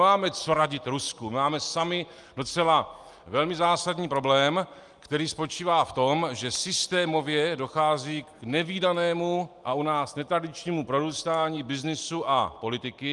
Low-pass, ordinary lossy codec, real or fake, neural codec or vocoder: 10.8 kHz; Opus, 32 kbps; real; none